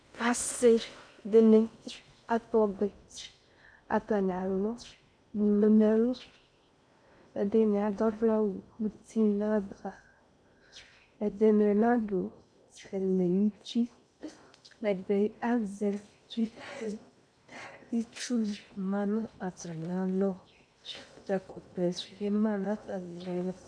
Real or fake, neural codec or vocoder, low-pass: fake; codec, 16 kHz in and 24 kHz out, 0.6 kbps, FocalCodec, streaming, 2048 codes; 9.9 kHz